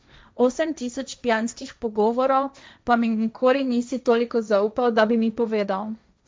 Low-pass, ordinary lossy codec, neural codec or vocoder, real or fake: none; none; codec, 16 kHz, 1.1 kbps, Voila-Tokenizer; fake